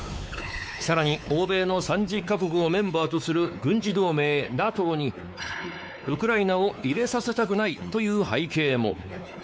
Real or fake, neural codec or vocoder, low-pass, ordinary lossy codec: fake; codec, 16 kHz, 4 kbps, X-Codec, WavLM features, trained on Multilingual LibriSpeech; none; none